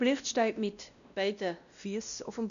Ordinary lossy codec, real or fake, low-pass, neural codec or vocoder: none; fake; 7.2 kHz; codec, 16 kHz, 1 kbps, X-Codec, WavLM features, trained on Multilingual LibriSpeech